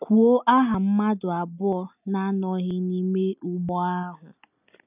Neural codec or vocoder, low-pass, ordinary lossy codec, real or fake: none; 3.6 kHz; none; real